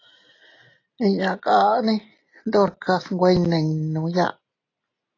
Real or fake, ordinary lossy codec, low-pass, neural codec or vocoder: real; AAC, 32 kbps; 7.2 kHz; none